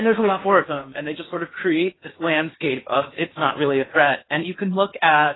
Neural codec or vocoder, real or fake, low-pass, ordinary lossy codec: codec, 16 kHz in and 24 kHz out, 0.8 kbps, FocalCodec, streaming, 65536 codes; fake; 7.2 kHz; AAC, 16 kbps